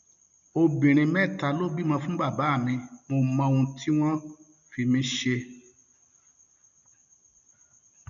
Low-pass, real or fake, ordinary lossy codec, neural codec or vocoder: 7.2 kHz; real; none; none